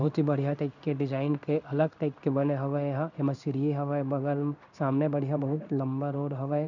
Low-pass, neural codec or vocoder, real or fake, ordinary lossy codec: 7.2 kHz; codec, 16 kHz in and 24 kHz out, 1 kbps, XY-Tokenizer; fake; none